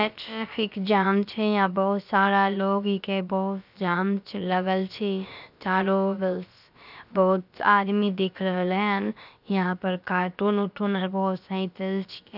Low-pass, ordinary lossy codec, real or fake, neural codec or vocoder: 5.4 kHz; none; fake; codec, 16 kHz, about 1 kbps, DyCAST, with the encoder's durations